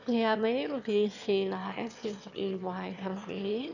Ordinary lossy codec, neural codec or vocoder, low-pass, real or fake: none; autoencoder, 22.05 kHz, a latent of 192 numbers a frame, VITS, trained on one speaker; 7.2 kHz; fake